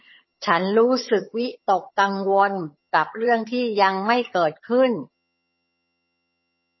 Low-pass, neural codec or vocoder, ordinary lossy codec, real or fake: 7.2 kHz; vocoder, 22.05 kHz, 80 mel bands, HiFi-GAN; MP3, 24 kbps; fake